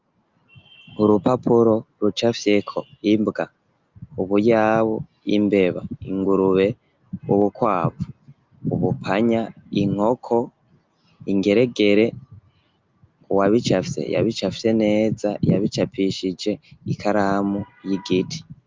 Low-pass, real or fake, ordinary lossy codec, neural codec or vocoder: 7.2 kHz; real; Opus, 24 kbps; none